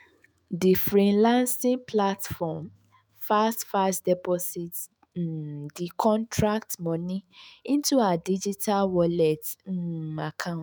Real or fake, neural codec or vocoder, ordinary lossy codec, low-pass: fake; autoencoder, 48 kHz, 128 numbers a frame, DAC-VAE, trained on Japanese speech; none; none